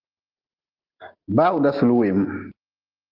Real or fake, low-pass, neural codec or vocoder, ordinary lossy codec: real; 5.4 kHz; none; Opus, 16 kbps